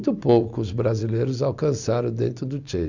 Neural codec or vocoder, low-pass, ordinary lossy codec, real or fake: none; 7.2 kHz; none; real